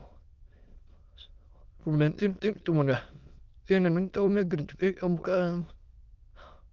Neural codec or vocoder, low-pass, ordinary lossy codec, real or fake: autoencoder, 22.05 kHz, a latent of 192 numbers a frame, VITS, trained on many speakers; 7.2 kHz; Opus, 32 kbps; fake